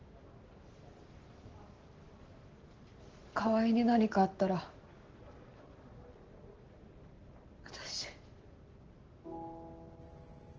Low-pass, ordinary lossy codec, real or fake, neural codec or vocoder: 7.2 kHz; Opus, 16 kbps; real; none